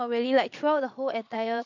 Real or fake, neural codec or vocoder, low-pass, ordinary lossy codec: fake; vocoder, 22.05 kHz, 80 mel bands, Vocos; 7.2 kHz; none